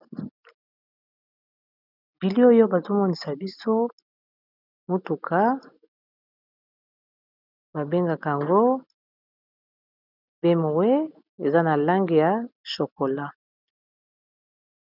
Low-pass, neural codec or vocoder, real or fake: 5.4 kHz; none; real